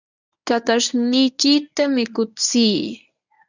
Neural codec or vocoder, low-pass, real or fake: codec, 24 kHz, 0.9 kbps, WavTokenizer, medium speech release version 1; 7.2 kHz; fake